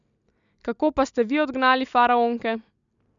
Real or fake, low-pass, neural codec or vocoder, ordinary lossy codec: real; 7.2 kHz; none; none